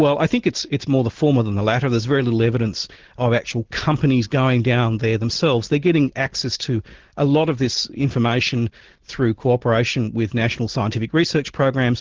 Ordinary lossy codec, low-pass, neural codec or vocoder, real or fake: Opus, 16 kbps; 7.2 kHz; none; real